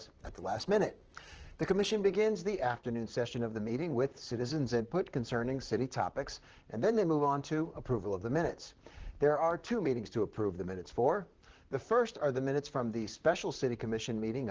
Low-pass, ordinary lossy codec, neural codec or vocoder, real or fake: 7.2 kHz; Opus, 16 kbps; vocoder, 44.1 kHz, 128 mel bands, Pupu-Vocoder; fake